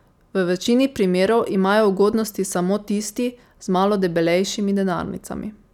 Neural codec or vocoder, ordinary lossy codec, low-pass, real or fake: none; none; 19.8 kHz; real